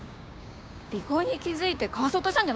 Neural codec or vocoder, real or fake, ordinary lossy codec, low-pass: codec, 16 kHz, 6 kbps, DAC; fake; none; none